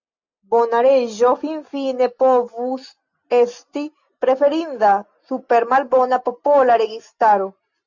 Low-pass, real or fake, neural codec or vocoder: 7.2 kHz; real; none